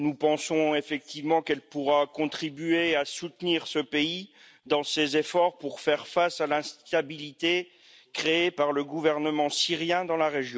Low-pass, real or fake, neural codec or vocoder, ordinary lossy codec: none; real; none; none